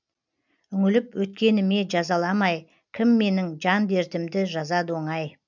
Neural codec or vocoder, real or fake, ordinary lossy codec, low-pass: none; real; none; 7.2 kHz